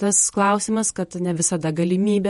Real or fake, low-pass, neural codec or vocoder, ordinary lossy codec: fake; 19.8 kHz; vocoder, 48 kHz, 128 mel bands, Vocos; MP3, 48 kbps